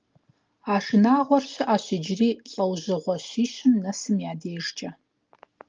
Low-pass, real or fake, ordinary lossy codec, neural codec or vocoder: 7.2 kHz; real; Opus, 24 kbps; none